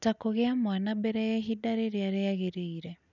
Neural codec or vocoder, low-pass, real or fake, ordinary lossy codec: none; 7.2 kHz; real; none